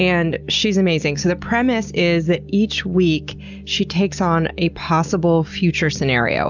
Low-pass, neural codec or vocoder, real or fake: 7.2 kHz; none; real